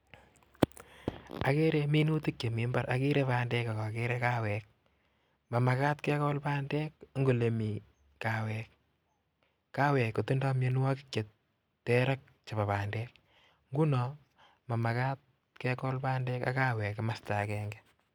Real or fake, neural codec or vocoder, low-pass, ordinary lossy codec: real; none; 19.8 kHz; none